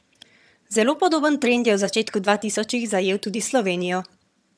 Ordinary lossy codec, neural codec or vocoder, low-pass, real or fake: none; vocoder, 22.05 kHz, 80 mel bands, HiFi-GAN; none; fake